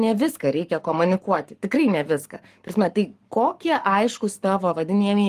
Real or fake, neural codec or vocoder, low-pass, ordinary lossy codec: fake; codec, 44.1 kHz, 7.8 kbps, Pupu-Codec; 14.4 kHz; Opus, 32 kbps